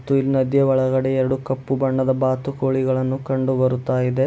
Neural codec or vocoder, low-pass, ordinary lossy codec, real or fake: none; none; none; real